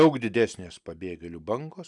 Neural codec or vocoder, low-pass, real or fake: none; 10.8 kHz; real